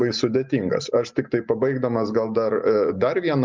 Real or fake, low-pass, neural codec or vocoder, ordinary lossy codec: real; 7.2 kHz; none; Opus, 24 kbps